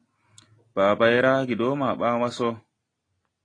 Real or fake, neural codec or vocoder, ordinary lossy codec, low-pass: real; none; AAC, 32 kbps; 9.9 kHz